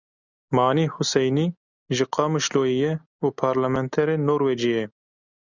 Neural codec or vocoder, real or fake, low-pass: none; real; 7.2 kHz